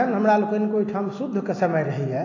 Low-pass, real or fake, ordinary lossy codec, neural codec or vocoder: 7.2 kHz; real; AAC, 32 kbps; none